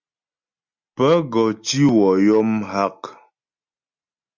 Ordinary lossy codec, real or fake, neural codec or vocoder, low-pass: Opus, 64 kbps; real; none; 7.2 kHz